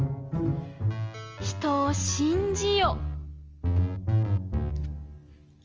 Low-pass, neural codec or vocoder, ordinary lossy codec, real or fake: 7.2 kHz; none; Opus, 24 kbps; real